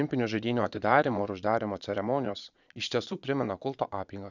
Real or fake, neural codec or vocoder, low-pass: fake; vocoder, 22.05 kHz, 80 mel bands, Vocos; 7.2 kHz